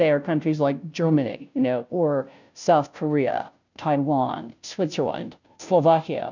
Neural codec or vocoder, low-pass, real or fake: codec, 16 kHz, 0.5 kbps, FunCodec, trained on Chinese and English, 25 frames a second; 7.2 kHz; fake